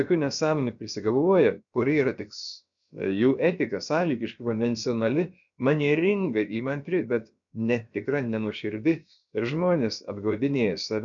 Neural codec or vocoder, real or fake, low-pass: codec, 16 kHz, about 1 kbps, DyCAST, with the encoder's durations; fake; 7.2 kHz